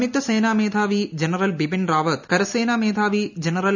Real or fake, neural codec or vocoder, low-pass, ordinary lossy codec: real; none; 7.2 kHz; none